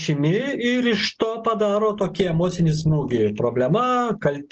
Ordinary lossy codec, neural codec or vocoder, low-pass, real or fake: Opus, 16 kbps; none; 7.2 kHz; real